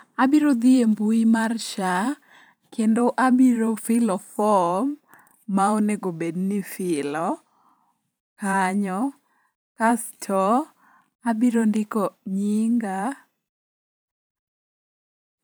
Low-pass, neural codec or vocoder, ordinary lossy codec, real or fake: none; vocoder, 44.1 kHz, 128 mel bands every 512 samples, BigVGAN v2; none; fake